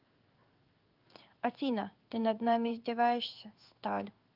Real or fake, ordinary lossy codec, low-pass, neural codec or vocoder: fake; Opus, 24 kbps; 5.4 kHz; codec, 16 kHz in and 24 kHz out, 1 kbps, XY-Tokenizer